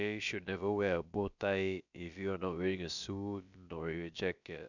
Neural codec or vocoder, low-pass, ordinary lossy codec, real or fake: codec, 16 kHz, about 1 kbps, DyCAST, with the encoder's durations; 7.2 kHz; none; fake